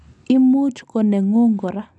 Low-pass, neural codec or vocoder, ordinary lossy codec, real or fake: 10.8 kHz; none; none; real